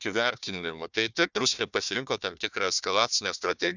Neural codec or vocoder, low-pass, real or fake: codec, 16 kHz, 1 kbps, FunCodec, trained on Chinese and English, 50 frames a second; 7.2 kHz; fake